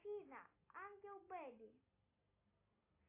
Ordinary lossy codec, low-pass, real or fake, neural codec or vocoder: AAC, 32 kbps; 3.6 kHz; real; none